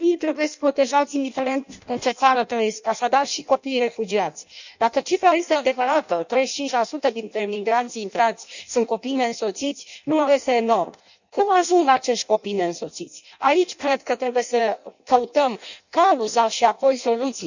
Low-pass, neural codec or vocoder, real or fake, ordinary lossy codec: 7.2 kHz; codec, 16 kHz in and 24 kHz out, 0.6 kbps, FireRedTTS-2 codec; fake; none